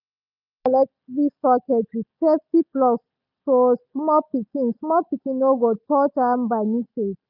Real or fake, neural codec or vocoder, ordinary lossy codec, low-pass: real; none; none; 5.4 kHz